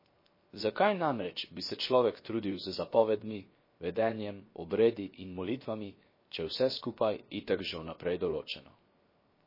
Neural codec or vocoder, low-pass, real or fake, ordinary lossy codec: codec, 16 kHz, 0.7 kbps, FocalCodec; 5.4 kHz; fake; MP3, 24 kbps